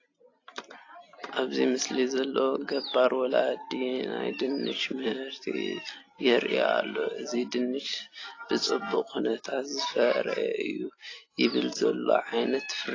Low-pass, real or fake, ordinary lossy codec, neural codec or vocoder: 7.2 kHz; real; AAC, 32 kbps; none